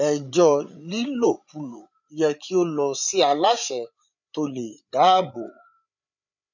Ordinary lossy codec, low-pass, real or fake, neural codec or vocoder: none; 7.2 kHz; fake; codec, 16 kHz, 8 kbps, FreqCodec, larger model